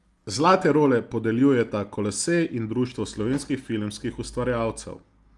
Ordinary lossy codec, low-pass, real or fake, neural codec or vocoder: Opus, 32 kbps; 10.8 kHz; real; none